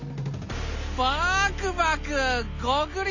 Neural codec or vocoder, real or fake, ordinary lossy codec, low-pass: none; real; none; 7.2 kHz